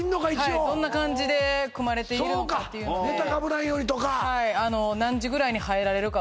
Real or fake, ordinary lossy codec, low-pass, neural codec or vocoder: real; none; none; none